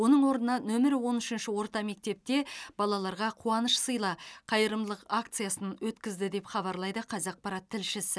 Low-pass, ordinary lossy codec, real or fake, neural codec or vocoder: none; none; real; none